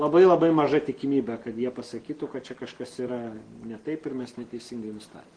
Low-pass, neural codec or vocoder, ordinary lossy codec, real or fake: 9.9 kHz; none; Opus, 16 kbps; real